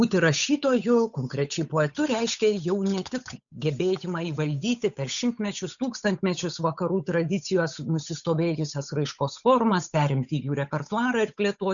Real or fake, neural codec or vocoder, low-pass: fake; codec, 16 kHz, 8 kbps, FunCodec, trained on Chinese and English, 25 frames a second; 7.2 kHz